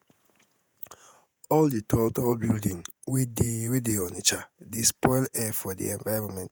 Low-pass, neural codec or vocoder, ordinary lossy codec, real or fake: none; vocoder, 48 kHz, 128 mel bands, Vocos; none; fake